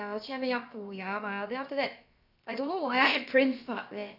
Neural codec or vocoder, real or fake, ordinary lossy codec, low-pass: codec, 16 kHz, about 1 kbps, DyCAST, with the encoder's durations; fake; none; 5.4 kHz